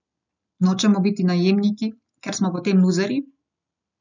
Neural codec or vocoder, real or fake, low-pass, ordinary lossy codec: none; real; 7.2 kHz; none